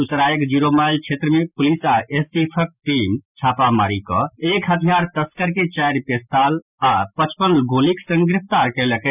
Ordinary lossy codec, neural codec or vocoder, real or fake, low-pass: none; none; real; 3.6 kHz